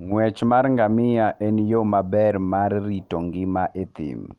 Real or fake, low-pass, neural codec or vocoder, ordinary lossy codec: real; 19.8 kHz; none; Opus, 32 kbps